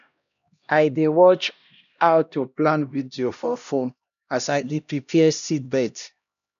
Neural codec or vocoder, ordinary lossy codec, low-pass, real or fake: codec, 16 kHz, 1 kbps, X-Codec, HuBERT features, trained on LibriSpeech; none; 7.2 kHz; fake